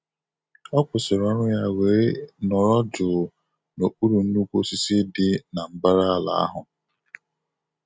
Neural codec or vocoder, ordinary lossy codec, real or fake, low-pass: none; none; real; none